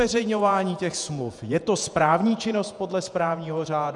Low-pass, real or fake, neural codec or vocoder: 10.8 kHz; fake; vocoder, 48 kHz, 128 mel bands, Vocos